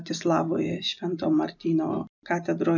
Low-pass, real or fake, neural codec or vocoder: 7.2 kHz; real; none